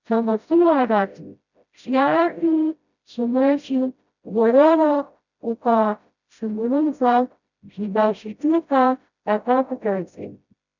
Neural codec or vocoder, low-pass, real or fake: codec, 16 kHz, 0.5 kbps, FreqCodec, smaller model; 7.2 kHz; fake